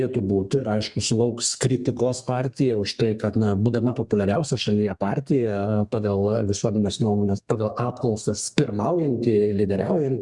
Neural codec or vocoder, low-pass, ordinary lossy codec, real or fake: codec, 32 kHz, 1.9 kbps, SNAC; 10.8 kHz; Opus, 64 kbps; fake